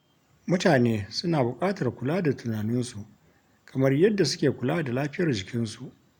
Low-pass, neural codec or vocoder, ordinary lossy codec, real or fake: 19.8 kHz; none; none; real